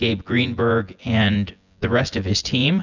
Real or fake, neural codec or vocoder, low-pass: fake; vocoder, 24 kHz, 100 mel bands, Vocos; 7.2 kHz